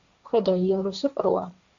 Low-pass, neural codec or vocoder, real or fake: 7.2 kHz; codec, 16 kHz, 1.1 kbps, Voila-Tokenizer; fake